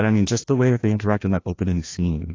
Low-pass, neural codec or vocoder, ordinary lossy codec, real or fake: 7.2 kHz; codec, 16 kHz, 1 kbps, FreqCodec, larger model; AAC, 32 kbps; fake